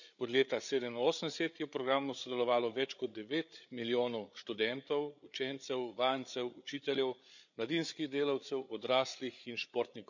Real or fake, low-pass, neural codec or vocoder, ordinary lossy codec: fake; 7.2 kHz; codec, 16 kHz, 8 kbps, FreqCodec, larger model; none